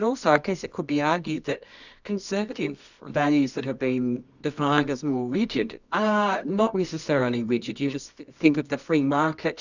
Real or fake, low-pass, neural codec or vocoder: fake; 7.2 kHz; codec, 24 kHz, 0.9 kbps, WavTokenizer, medium music audio release